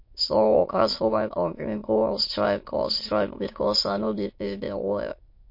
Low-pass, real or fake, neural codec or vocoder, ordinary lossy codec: 5.4 kHz; fake; autoencoder, 22.05 kHz, a latent of 192 numbers a frame, VITS, trained on many speakers; MP3, 32 kbps